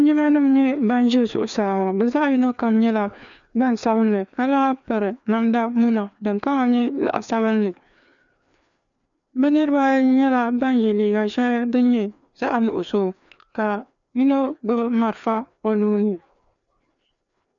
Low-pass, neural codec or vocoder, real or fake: 7.2 kHz; codec, 16 kHz, 2 kbps, FreqCodec, larger model; fake